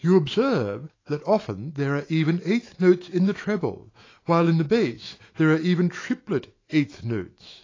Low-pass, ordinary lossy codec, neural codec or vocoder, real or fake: 7.2 kHz; AAC, 32 kbps; autoencoder, 48 kHz, 128 numbers a frame, DAC-VAE, trained on Japanese speech; fake